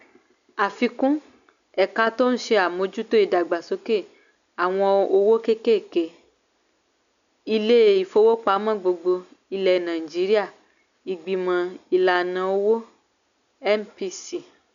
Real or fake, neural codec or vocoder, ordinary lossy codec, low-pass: real; none; none; 7.2 kHz